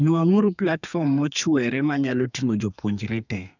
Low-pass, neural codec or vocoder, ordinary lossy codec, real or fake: 7.2 kHz; codec, 32 kHz, 1.9 kbps, SNAC; none; fake